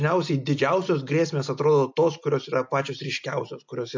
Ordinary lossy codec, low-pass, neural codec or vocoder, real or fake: MP3, 64 kbps; 7.2 kHz; none; real